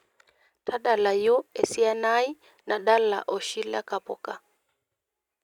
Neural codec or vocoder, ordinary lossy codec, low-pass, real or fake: none; none; 19.8 kHz; real